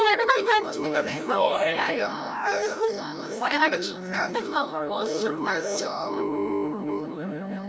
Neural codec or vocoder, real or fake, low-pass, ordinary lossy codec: codec, 16 kHz, 0.5 kbps, FreqCodec, larger model; fake; none; none